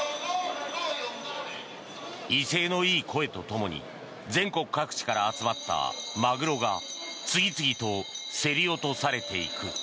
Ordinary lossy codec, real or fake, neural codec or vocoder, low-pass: none; real; none; none